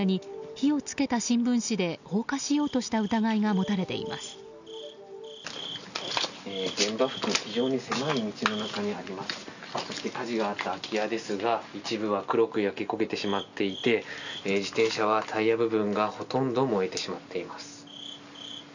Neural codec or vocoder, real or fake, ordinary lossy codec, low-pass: none; real; none; 7.2 kHz